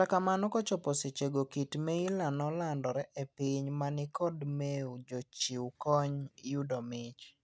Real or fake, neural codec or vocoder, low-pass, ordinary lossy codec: real; none; none; none